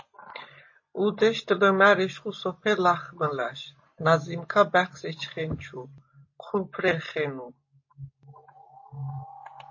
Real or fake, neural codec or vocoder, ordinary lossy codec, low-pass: real; none; MP3, 32 kbps; 7.2 kHz